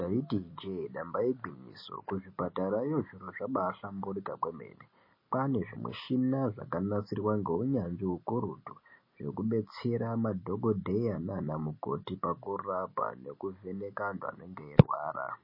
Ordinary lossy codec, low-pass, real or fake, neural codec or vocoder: MP3, 24 kbps; 5.4 kHz; real; none